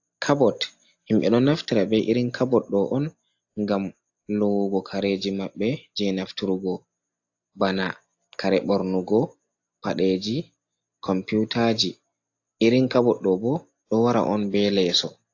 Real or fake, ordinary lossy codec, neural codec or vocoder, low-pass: real; AAC, 48 kbps; none; 7.2 kHz